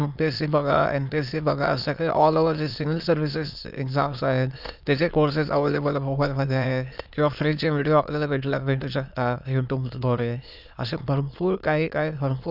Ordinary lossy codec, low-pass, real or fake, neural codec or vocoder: none; 5.4 kHz; fake; autoencoder, 22.05 kHz, a latent of 192 numbers a frame, VITS, trained on many speakers